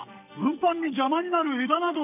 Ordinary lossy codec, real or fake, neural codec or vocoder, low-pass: none; fake; codec, 44.1 kHz, 2.6 kbps, SNAC; 3.6 kHz